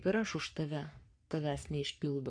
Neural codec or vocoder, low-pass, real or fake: codec, 44.1 kHz, 3.4 kbps, Pupu-Codec; 9.9 kHz; fake